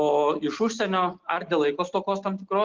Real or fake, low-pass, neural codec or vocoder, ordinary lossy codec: real; 7.2 kHz; none; Opus, 16 kbps